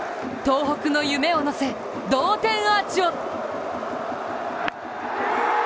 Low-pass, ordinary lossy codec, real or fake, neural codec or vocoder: none; none; real; none